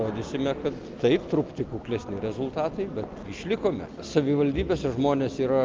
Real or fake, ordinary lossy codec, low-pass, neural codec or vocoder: real; Opus, 32 kbps; 7.2 kHz; none